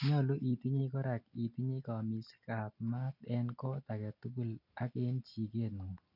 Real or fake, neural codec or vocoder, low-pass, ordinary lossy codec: real; none; 5.4 kHz; none